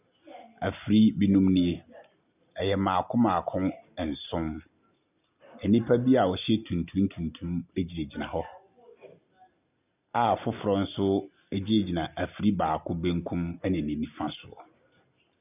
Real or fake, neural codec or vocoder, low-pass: real; none; 3.6 kHz